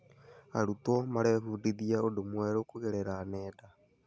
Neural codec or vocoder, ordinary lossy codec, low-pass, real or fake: none; none; none; real